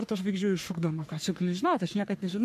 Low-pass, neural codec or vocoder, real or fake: 14.4 kHz; codec, 44.1 kHz, 3.4 kbps, Pupu-Codec; fake